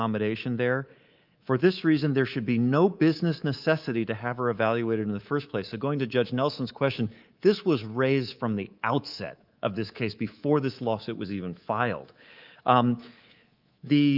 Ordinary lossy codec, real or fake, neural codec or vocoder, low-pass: Opus, 24 kbps; fake; codec, 24 kHz, 3.1 kbps, DualCodec; 5.4 kHz